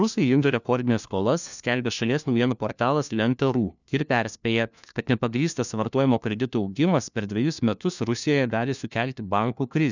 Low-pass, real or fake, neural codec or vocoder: 7.2 kHz; fake; codec, 16 kHz, 1 kbps, FunCodec, trained on LibriTTS, 50 frames a second